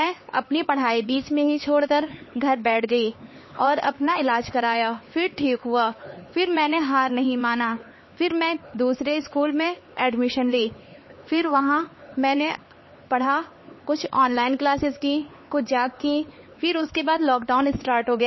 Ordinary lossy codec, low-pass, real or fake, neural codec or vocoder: MP3, 24 kbps; 7.2 kHz; fake; codec, 16 kHz, 4 kbps, X-Codec, HuBERT features, trained on LibriSpeech